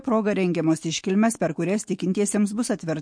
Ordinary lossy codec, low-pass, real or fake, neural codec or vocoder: MP3, 48 kbps; 9.9 kHz; fake; autoencoder, 48 kHz, 128 numbers a frame, DAC-VAE, trained on Japanese speech